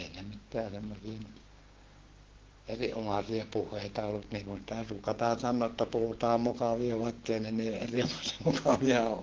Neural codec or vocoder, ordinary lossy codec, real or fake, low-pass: codec, 16 kHz, 6 kbps, DAC; Opus, 16 kbps; fake; 7.2 kHz